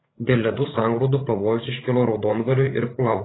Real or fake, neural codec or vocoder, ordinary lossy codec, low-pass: fake; codec, 16 kHz, 16 kbps, FreqCodec, larger model; AAC, 16 kbps; 7.2 kHz